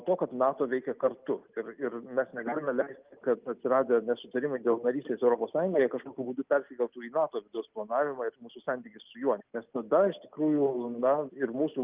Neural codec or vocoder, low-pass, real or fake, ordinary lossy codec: none; 3.6 kHz; real; Opus, 32 kbps